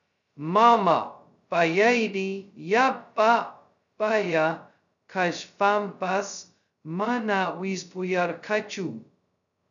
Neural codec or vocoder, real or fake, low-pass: codec, 16 kHz, 0.2 kbps, FocalCodec; fake; 7.2 kHz